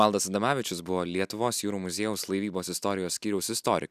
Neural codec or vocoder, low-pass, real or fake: none; 14.4 kHz; real